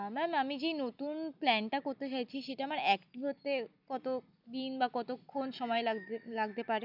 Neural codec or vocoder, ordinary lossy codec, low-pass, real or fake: none; none; 5.4 kHz; real